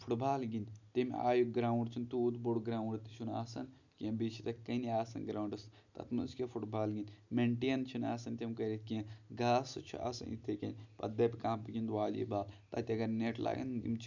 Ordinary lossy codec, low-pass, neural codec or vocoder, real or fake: none; 7.2 kHz; none; real